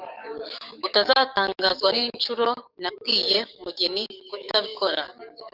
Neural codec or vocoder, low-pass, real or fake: codec, 44.1 kHz, 7.8 kbps, DAC; 5.4 kHz; fake